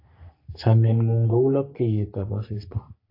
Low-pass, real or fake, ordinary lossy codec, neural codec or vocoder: 5.4 kHz; fake; AAC, 32 kbps; codec, 44.1 kHz, 3.4 kbps, Pupu-Codec